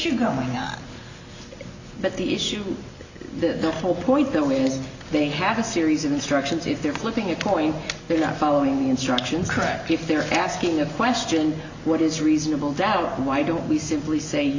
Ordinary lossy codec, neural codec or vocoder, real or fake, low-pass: Opus, 64 kbps; none; real; 7.2 kHz